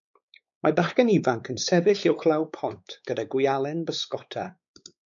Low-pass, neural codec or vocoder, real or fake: 7.2 kHz; codec, 16 kHz, 4 kbps, X-Codec, WavLM features, trained on Multilingual LibriSpeech; fake